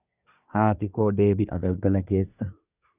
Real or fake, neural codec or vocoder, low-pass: fake; codec, 24 kHz, 1 kbps, SNAC; 3.6 kHz